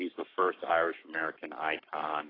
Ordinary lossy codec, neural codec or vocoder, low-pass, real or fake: AAC, 24 kbps; codec, 44.1 kHz, 7.8 kbps, Pupu-Codec; 5.4 kHz; fake